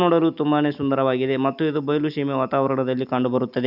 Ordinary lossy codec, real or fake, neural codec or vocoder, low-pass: none; real; none; 5.4 kHz